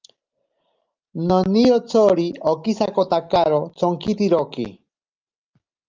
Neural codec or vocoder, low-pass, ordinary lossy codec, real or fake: none; 7.2 kHz; Opus, 24 kbps; real